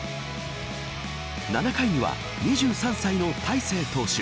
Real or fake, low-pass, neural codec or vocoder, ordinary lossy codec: real; none; none; none